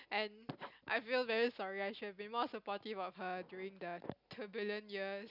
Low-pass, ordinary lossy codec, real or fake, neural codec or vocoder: 5.4 kHz; none; real; none